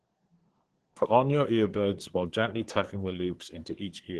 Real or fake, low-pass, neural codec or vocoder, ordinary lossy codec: fake; 10.8 kHz; codec, 24 kHz, 1 kbps, SNAC; Opus, 16 kbps